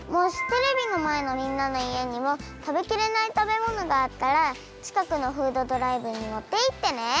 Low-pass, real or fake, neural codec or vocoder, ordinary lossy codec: none; real; none; none